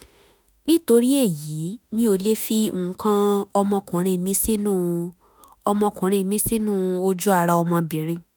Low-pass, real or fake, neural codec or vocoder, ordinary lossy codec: none; fake; autoencoder, 48 kHz, 32 numbers a frame, DAC-VAE, trained on Japanese speech; none